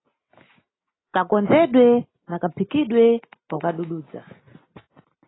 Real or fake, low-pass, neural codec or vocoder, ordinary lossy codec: real; 7.2 kHz; none; AAC, 16 kbps